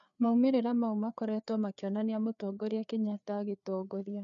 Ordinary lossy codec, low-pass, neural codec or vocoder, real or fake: none; 7.2 kHz; codec, 16 kHz, 4 kbps, FreqCodec, larger model; fake